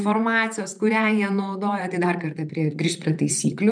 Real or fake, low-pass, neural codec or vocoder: fake; 9.9 kHz; vocoder, 44.1 kHz, 128 mel bands, Pupu-Vocoder